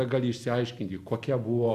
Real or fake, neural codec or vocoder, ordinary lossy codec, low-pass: real; none; Opus, 64 kbps; 14.4 kHz